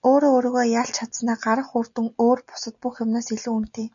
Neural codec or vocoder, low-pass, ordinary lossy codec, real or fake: none; 7.2 kHz; MP3, 96 kbps; real